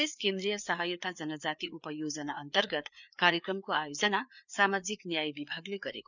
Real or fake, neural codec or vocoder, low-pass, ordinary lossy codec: fake; codec, 16 kHz, 4 kbps, FreqCodec, larger model; 7.2 kHz; none